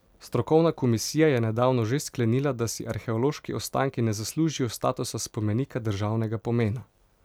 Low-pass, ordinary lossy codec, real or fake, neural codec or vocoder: 19.8 kHz; none; fake; vocoder, 44.1 kHz, 128 mel bands every 256 samples, BigVGAN v2